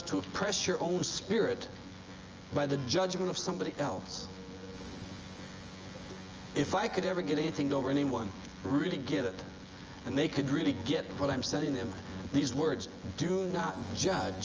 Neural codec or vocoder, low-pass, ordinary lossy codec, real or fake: vocoder, 24 kHz, 100 mel bands, Vocos; 7.2 kHz; Opus, 24 kbps; fake